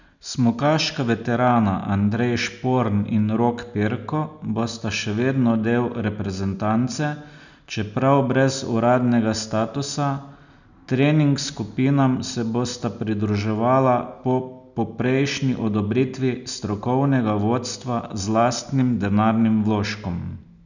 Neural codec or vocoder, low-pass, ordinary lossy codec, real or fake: none; 7.2 kHz; none; real